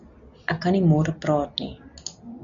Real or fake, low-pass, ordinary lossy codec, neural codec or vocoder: real; 7.2 kHz; MP3, 96 kbps; none